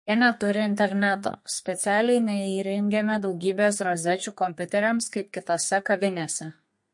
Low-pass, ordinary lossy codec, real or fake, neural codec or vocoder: 10.8 kHz; MP3, 48 kbps; fake; codec, 32 kHz, 1.9 kbps, SNAC